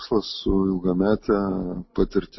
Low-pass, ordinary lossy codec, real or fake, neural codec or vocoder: 7.2 kHz; MP3, 24 kbps; real; none